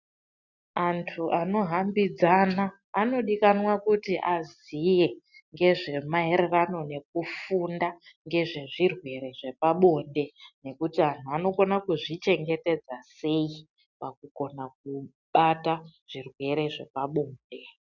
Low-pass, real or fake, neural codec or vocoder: 7.2 kHz; real; none